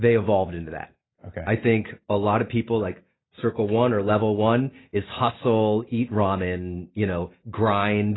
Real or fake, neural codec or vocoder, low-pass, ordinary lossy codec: real; none; 7.2 kHz; AAC, 16 kbps